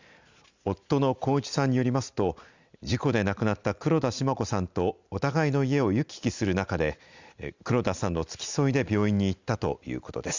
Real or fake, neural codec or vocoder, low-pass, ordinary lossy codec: real; none; 7.2 kHz; Opus, 64 kbps